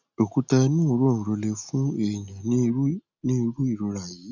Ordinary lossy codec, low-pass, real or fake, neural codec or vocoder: none; 7.2 kHz; real; none